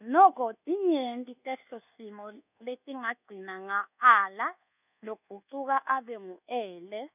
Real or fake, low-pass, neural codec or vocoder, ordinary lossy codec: fake; 3.6 kHz; codec, 24 kHz, 1.2 kbps, DualCodec; none